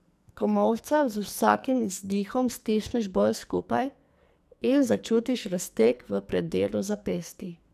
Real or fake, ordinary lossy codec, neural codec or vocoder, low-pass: fake; none; codec, 44.1 kHz, 2.6 kbps, SNAC; 14.4 kHz